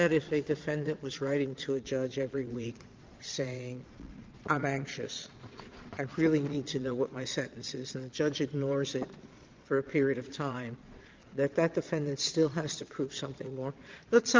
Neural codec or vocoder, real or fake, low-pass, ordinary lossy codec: vocoder, 22.05 kHz, 80 mel bands, Vocos; fake; 7.2 kHz; Opus, 24 kbps